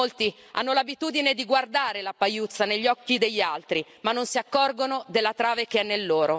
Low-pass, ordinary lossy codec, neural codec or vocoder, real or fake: none; none; none; real